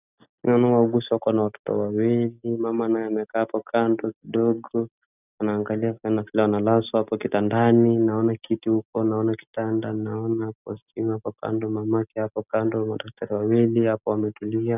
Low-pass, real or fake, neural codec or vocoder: 3.6 kHz; real; none